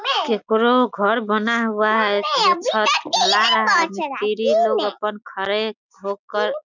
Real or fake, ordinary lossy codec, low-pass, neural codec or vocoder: real; none; 7.2 kHz; none